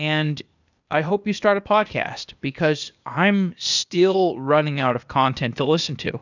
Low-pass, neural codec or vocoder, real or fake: 7.2 kHz; codec, 16 kHz, 0.8 kbps, ZipCodec; fake